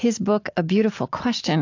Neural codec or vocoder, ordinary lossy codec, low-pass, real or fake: none; AAC, 48 kbps; 7.2 kHz; real